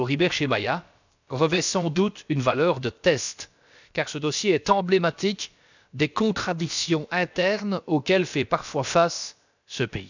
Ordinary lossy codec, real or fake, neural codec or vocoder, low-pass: none; fake; codec, 16 kHz, about 1 kbps, DyCAST, with the encoder's durations; 7.2 kHz